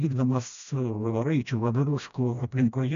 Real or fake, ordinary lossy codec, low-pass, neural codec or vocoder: fake; MP3, 64 kbps; 7.2 kHz; codec, 16 kHz, 1 kbps, FreqCodec, smaller model